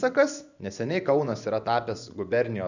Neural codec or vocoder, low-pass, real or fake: vocoder, 24 kHz, 100 mel bands, Vocos; 7.2 kHz; fake